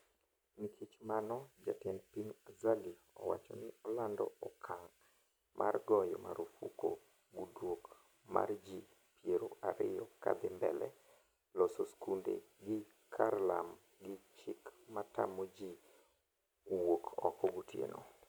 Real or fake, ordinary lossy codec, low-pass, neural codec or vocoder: real; none; none; none